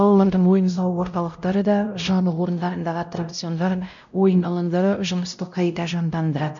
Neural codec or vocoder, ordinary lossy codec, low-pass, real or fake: codec, 16 kHz, 0.5 kbps, X-Codec, WavLM features, trained on Multilingual LibriSpeech; none; 7.2 kHz; fake